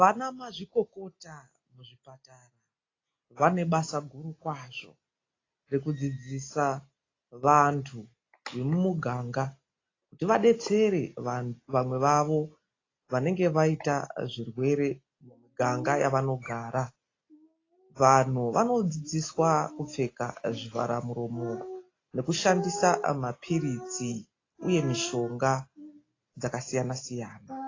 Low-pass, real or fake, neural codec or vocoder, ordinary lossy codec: 7.2 kHz; real; none; AAC, 32 kbps